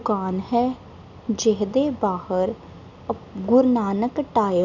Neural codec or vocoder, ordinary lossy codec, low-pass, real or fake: none; none; 7.2 kHz; real